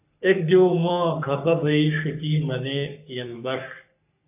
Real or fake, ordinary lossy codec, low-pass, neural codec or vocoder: fake; AAC, 32 kbps; 3.6 kHz; codec, 44.1 kHz, 3.4 kbps, Pupu-Codec